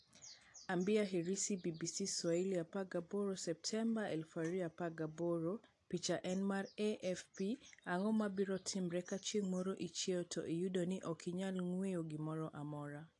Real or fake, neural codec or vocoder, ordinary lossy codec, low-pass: real; none; AAC, 48 kbps; 10.8 kHz